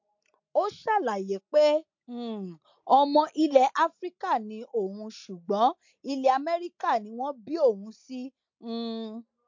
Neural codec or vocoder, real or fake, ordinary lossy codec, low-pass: none; real; MP3, 48 kbps; 7.2 kHz